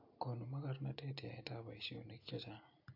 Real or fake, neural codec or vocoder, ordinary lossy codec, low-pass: real; none; AAC, 48 kbps; 5.4 kHz